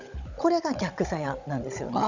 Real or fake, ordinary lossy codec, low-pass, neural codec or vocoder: fake; none; 7.2 kHz; codec, 16 kHz, 16 kbps, FunCodec, trained on Chinese and English, 50 frames a second